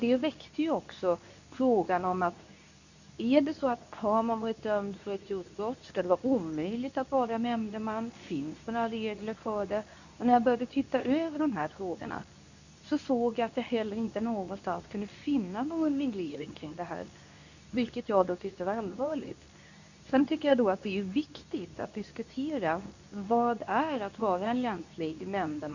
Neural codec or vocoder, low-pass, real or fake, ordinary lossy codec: codec, 24 kHz, 0.9 kbps, WavTokenizer, medium speech release version 2; 7.2 kHz; fake; none